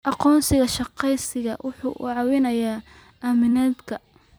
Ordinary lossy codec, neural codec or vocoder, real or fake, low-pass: none; vocoder, 44.1 kHz, 128 mel bands every 256 samples, BigVGAN v2; fake; none